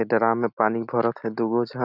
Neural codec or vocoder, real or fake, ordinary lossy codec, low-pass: none; real; none; 5.4 kHz